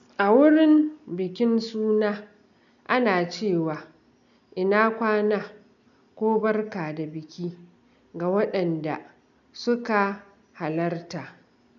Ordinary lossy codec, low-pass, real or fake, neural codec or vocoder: none; 7.2 kHz; real; none